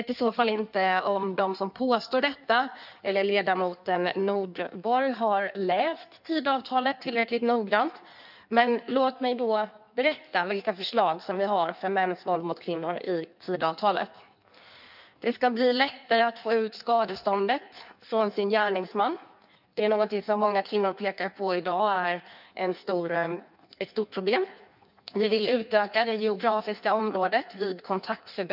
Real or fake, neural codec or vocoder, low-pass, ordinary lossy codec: fake; codec, 16 kHz in and 24 kHz out, 1.1 kbps, FireRedTTS-2 codec; 5.4 kHz; none